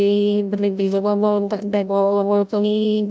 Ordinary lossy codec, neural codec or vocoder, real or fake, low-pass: none; codec, 16 kHz, 0.5 kbps, FreqCodec, larger model; fake; none